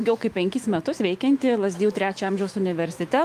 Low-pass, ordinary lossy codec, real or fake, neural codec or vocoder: 14.4 kHz; Opus, 32 kbps; fake; autoencoder, 48 kHz, 128 numbers a frame, DAC-VAE, trained on Japanese speech